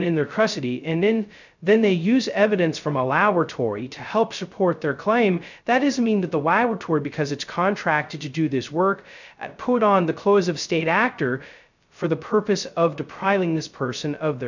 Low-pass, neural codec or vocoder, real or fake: 7.2 kHz; codec, 16 kHz, 0.2 kbps, FocalCodec; fake